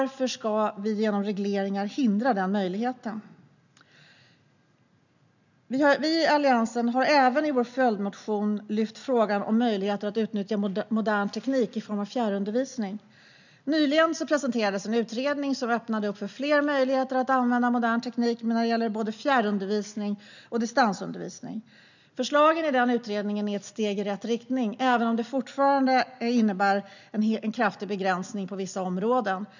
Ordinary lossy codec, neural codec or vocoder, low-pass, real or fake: none; none; 7.2 kHz; real